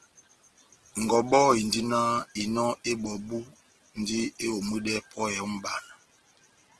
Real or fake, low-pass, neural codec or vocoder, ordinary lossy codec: real; 10.8 kHz; none; Opus, 16 kbps